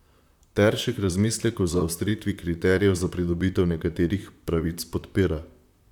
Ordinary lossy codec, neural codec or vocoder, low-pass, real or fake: none; vocoder, 44.1 kHz, 128 mel bands, Pupu-Vocoder; 19.8 kHz; fake